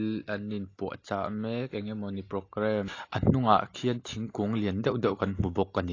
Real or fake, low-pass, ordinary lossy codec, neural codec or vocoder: real; 7.2 kHz; AAC, 32 kbps; none